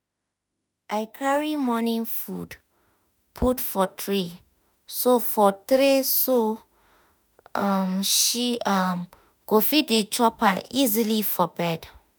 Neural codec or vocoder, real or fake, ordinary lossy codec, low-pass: autoencoder, 48 kHz, 32 numbers a frame, DAC-VAE, trained on Japanese speech; fake; none; none